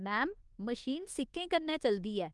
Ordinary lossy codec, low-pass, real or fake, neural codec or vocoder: Opus, 24 kbps; 10.8 kHz; fake; codec, 24 kHz, 1.2 kbps, DualCodec